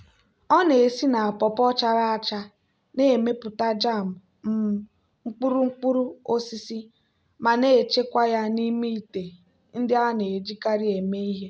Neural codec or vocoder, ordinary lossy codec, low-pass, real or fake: none; none; none; real